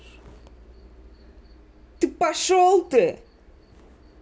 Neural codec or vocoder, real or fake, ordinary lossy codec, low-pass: none; real; none; none